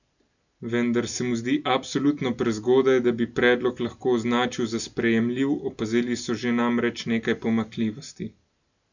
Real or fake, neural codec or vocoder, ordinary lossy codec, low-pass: real; none; none; 7.2 kHz